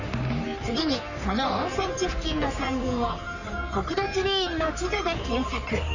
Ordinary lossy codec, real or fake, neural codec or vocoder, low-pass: none; fake; codec, 44.1 kHz, 3.4 kbps, Pupu-Codec; 7.2 kHz